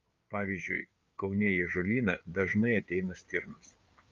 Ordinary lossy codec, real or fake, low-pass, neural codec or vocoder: Opus, 32 kbps; fake; 7.2 kHz; codec, 16 kHz, 4 kbps, FreqCodec, larger model